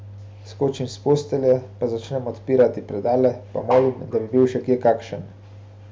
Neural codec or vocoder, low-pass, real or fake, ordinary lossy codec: none; none; real; none